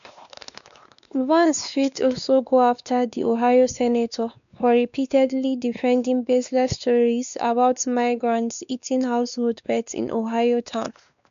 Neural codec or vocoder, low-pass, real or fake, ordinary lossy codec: codec, 16 kHz, 2 kbps, X-Codec, WavLM features, trained on Multilingual LibriSpeech; 7.2 kHz; fake; MP3, 96 kbps